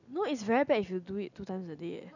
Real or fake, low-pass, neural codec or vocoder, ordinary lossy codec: real; 7.2 kHz; none; none